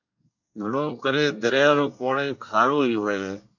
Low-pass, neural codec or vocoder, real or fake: 7.2 kHz; codec, 24 kHz, 1 kbps, SNAC; fake